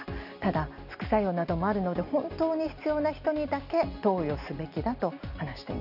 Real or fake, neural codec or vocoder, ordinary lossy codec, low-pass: real; none; none; 5.4 kHz